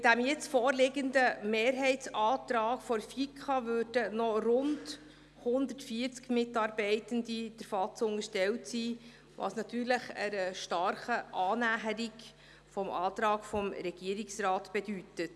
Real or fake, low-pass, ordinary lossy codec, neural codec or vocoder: real; none; none; none